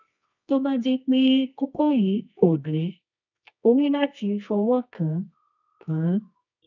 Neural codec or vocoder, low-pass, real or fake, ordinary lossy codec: codec, 24 kHz, 0.9 kbps, WavTokenizer, medium music audio release; 7.2 kHz; fake; none